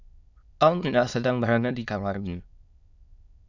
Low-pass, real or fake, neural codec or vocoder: 7.2 kHz; fake; autoencoder, 22.05 kHz, a latent of 192 numbers a frame, VITS, trained on many speakers